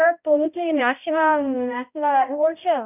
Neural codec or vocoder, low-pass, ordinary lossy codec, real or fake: codec, 16 kHz, 0.5 kbps, X-Codec, HuBERT features, trained on balanced general audio; 3.6 kHz; none; fake